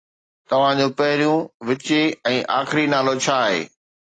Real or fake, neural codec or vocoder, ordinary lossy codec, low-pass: real; none; AAC, 32 kbps; 9.9 kHz